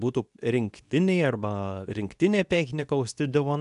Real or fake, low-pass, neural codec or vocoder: fake; 10.8 kHz; codec, 24 kHz, 0.9 kbps, WavTokenizer, medium speech release version 2